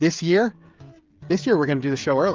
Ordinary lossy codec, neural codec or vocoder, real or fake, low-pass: Opus, 24 kbps; codec, 16 kHz, 16 kbps, FreqCodec, smaller model; fake; 7.2 kHz